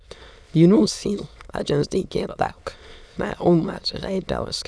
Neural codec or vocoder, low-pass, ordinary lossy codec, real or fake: autoencoder, 22.05 kHz, a latent of 192 numbers a frame, VITS, trained on many speakers; none; none; fake